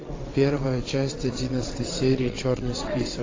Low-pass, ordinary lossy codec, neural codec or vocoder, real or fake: 7.2 kHz; AAC, 32 kbps; vocoder, 22.05 kHz, 80 mel bands, WaveNeXt; fake